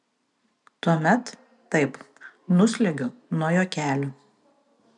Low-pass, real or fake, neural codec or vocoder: 10.8 kHz; real; none